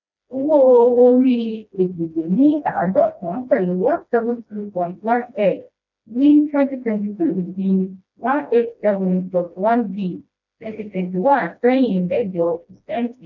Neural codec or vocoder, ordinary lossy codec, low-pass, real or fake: codec, 16 kHz, 1 kbps, FreqCodec, smaller model; none; 7.2 kHz; fake